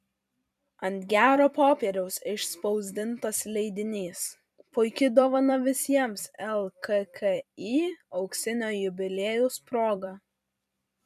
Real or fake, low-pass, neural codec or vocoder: fake; 14.4 kHz; vocoder, 44.1 kHz, 128 mel bands every 256 samples, BigVGAN v2